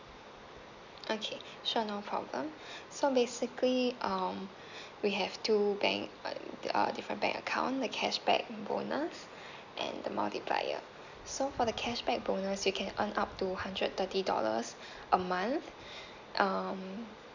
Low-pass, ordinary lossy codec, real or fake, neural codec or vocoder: 7.2 kHz; none; real; none